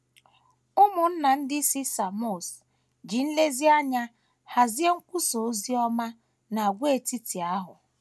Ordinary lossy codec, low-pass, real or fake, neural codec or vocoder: none; none; real; none